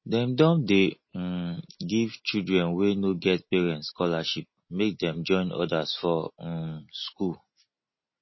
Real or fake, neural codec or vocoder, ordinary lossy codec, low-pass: real; none; MP3, 24 kbps; 7.2 kHz